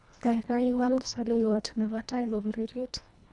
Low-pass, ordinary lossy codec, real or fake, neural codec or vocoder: 10.8 kHz; none; fake; codec, 24 kHz, 1.5 kbps, HILCodec